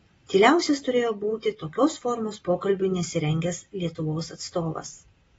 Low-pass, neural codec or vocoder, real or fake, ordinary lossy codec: 19.8 kHz; none; real; AAC, 24 kbps